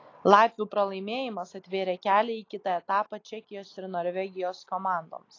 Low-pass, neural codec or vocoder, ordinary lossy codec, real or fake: 7.2 kHz; none; AAC, 32 kbps; real